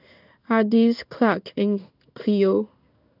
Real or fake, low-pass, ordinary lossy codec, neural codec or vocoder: fake; 5.4 kHz; none; codec, 16 kHz in and 24 kHz out, 1 kbps, XY-Tokenizer